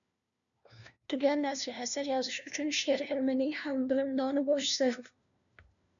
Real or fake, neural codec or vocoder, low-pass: fake; codec, 16 kHz, 1 kbps, FunCodec, trained on LibriTTS, 50 frames a second; 7.2 kHz